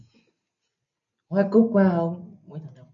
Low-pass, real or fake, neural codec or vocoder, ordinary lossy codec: 7.2 kHz; real; none; MP3, 96 kbps